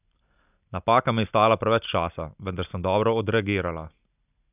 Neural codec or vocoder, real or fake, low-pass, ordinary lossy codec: none; real; 3.6 kHz; none